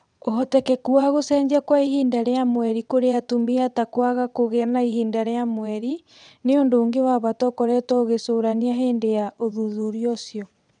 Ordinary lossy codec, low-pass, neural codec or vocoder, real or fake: none; 9.9 kHz; vocoder, 22.05 kHz, 80 mel bands, WaveNeXt; fake